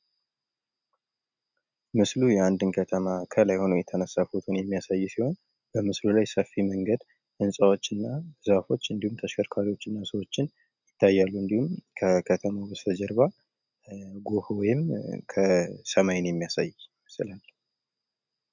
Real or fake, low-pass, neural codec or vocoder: real; 7.2 kHz; none